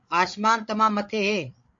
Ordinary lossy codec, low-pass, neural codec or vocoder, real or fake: MP3, 48 kbps; 7.2 kHz; codec, 16 kHz, 8 kbps, FreqCodec, larger model; fake